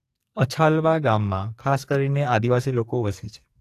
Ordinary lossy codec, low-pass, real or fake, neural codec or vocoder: AAC, 64 kbps; 14.4 kHz; fake; codec, 44.1 kHz, 2.6 kbps, SNAC